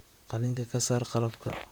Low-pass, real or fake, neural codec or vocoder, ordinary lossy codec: none; fake; vocoder, 44.1 kHz, 128 mel bands, Pupu-Vocoder; none